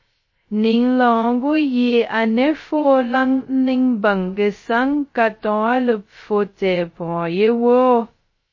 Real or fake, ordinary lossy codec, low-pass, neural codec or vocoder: fake; MP3, 32 kbps; 7.2 kHz; codec, 16 kHz, 0.2 kbps, FocalCodec